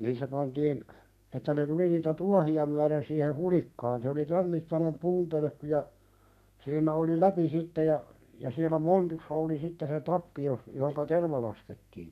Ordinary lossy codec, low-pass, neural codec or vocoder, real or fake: none; 14.4 kHz; codec, 32 kHz, 1.9 kbps, SNAC; fake